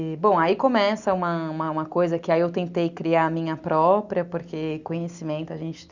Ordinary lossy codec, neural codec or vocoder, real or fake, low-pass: Opus, 64 kbps; none; real; 7.2 kHz